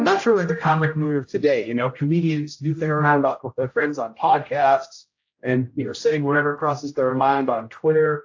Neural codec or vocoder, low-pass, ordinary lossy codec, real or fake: codec, 16 kHz, 0.5 kbps, X-Codec, HuBERT features, trained on general audio; 7.2 kHz; AAC, 48 kbps; fake